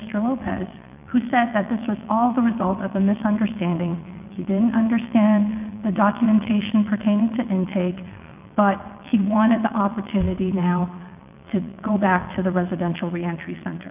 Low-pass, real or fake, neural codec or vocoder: 3.6 kHz; fake; vocoder, 22.05 kHz, 80 mel bands, WaveNeXt